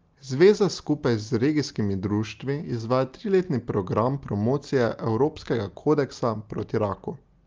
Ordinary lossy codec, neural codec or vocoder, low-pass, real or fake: Opus, 24 kbps; none; 7.2 kHz; real